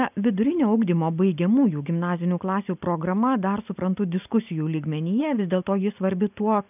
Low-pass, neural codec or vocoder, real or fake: 3.6 kHz; none; real